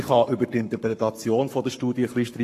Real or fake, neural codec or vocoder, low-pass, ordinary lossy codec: fake; codec, 44.1 kHz, 7.8 kbps, Pupu-Codec; 14.4 kHz; AAC, 48 kbps